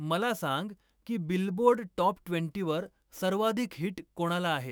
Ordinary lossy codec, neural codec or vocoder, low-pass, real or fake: none; autoencoder, 48 kHz, 128 numbers a frame, DAC-VAE, trained on Japanese speech; none; fake